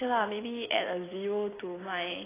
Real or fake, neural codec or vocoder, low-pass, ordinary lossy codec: real; none; 3.6 kHz; AAC, 16 kbps